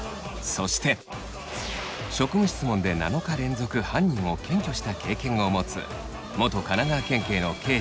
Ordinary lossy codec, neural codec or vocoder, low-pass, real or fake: none; none; none; real